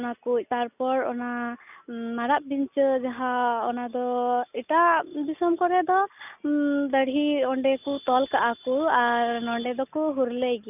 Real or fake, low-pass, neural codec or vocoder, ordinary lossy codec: real; 3.6 kHz; none; none